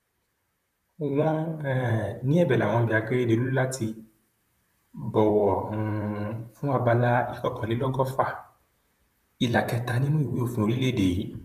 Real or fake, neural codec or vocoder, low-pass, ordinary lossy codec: fake; vocoder, 44.1 kHz, 128 mel bands, Pupu-Vocoder; 14.4 kHz; none